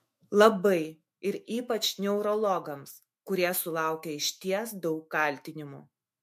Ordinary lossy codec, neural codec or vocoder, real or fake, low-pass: MP3, 64 kbps; autoencoder, 48 kHz, 128 numbers a frame, DAC-VAE, trained on Japanese speech; fake; 14.4 kHz